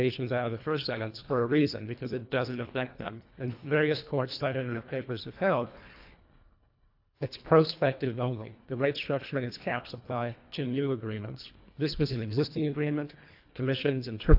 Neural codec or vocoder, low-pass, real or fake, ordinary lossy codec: codec, 24 kHz, 1.5 kbps, HILCodec; 5.4 kHz; fake; AAC, 48 kbps